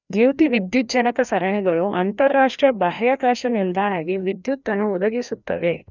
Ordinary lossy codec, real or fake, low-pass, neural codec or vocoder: none; fake; 7.2 kHz; codec, 16 kHz, 1 kbps, FreqCodec, larger model